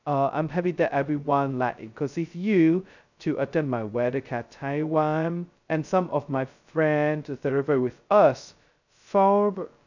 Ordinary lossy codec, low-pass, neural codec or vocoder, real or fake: none; 7.2 kHz; codec, 16 kHz, 0.2 kbps, FocalCodec; fake